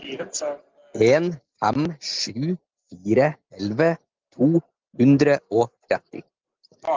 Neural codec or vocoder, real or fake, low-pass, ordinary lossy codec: none; real; 7.2 kHz; Opus, 32 kbps